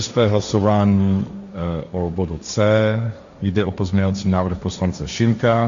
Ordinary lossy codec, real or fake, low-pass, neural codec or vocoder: MP3, 64 kbps; fake; 7.2 kHz; codec, 16 kHz, 1.1 kbps, Voila-Tokenizer